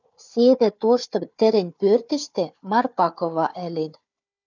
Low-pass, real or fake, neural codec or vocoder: 7.2 kHz; fake; codec, 16 kHz, 4 kbps, FunCodec, trained on Chinese and English, 50 frames a second